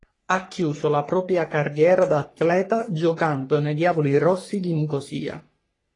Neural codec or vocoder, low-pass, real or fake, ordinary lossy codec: codec, 44.1 kHz, 3.4 kbps, Pupu-Codec; 10.8 kHz; fake; AAC, 32 kbps